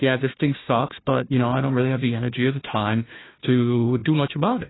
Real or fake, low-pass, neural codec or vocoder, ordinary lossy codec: fake; 7.2 kHz; codec, 16 kHz, 1 kbps, FunCodec, trained on Chinese and English, 50 frames a second; AAC, 16 kbps